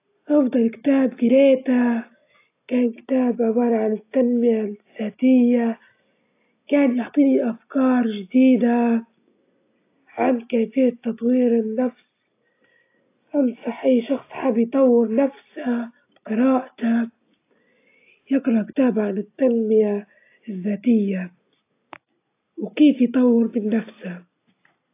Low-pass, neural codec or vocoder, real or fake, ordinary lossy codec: 3.6 kHz; none; real; AAC, 24 kbps